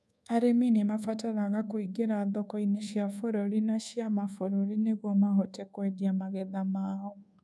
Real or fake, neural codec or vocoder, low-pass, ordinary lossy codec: fake; codec, 24 kHz, 1.2 kbps, DualCodec; none; none